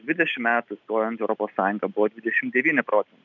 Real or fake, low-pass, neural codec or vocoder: real; 7.2 kHz; none